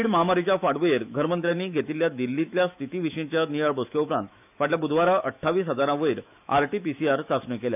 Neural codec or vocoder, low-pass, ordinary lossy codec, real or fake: autoencoder, 48 kHz, 128 numbers a frame, DAC-VAE, trained on Japanese speech; 3.6 kHz; none; fake